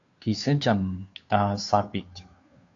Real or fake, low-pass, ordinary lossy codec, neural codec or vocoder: fake; 7.2 kHz; AAC, 48 kbps; codec, 16 kHz, 2 kbps, FunCodec, trained on Chinese and English, 25 frames a second